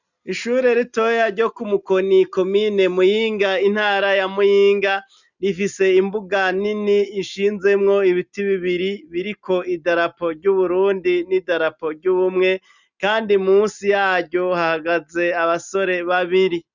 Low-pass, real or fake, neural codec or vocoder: 7.2 kHz; real; none